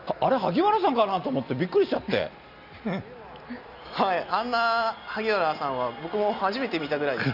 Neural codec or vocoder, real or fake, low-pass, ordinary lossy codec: none; real; 5.4 kHz; none